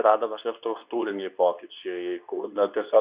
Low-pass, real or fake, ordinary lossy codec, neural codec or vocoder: 3.6 kHz; fake; Opus, 64 kbps; codec, 24 kHz, 0.9 kbps, WavTokenizer, medium speech release version 2